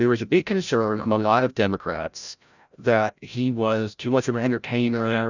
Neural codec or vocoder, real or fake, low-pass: codec, 16 kHz, 0.5 kbps, FreqCodec, larger model; fake; 7.2 kHz